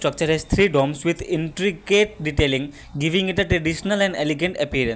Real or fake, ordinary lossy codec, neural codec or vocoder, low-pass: real; none; none; none